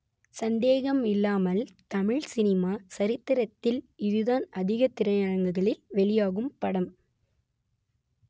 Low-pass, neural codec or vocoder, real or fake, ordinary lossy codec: none; none; real; none